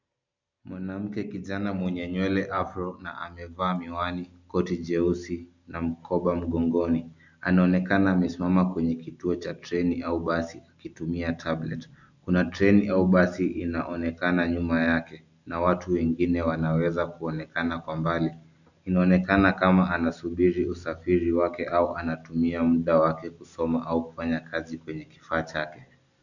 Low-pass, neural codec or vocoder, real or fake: 7.2 kHz; none; real